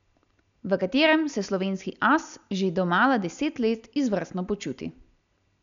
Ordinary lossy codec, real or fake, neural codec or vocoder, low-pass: MP3, 96 kbps; real; none; 7.2 kHz